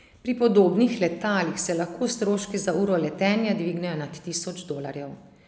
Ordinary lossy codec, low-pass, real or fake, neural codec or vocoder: none; none; real; none